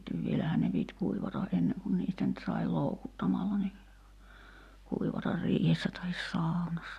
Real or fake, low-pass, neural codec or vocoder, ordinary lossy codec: real; 14.4 kHz; none; none